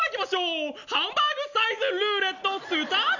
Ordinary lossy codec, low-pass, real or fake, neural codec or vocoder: none; 7.2 kHz; real; none